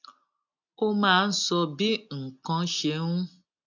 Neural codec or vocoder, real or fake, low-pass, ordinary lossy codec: none; real; 7.2 kHz; none